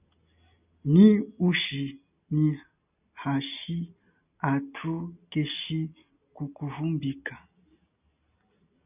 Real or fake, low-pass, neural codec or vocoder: real; 3.6 kHz; none